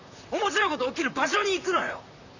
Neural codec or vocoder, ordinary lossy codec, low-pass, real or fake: vocoder, 44.1 kHz, 128 mel bands, Pupu-Vocoder; none; 7.2 kHz; fake